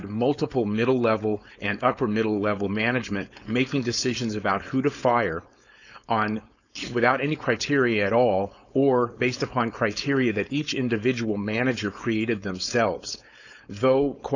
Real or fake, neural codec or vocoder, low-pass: fake; codec, 16 kHz, 4.8 kbps, FACodec; 7.2 kHz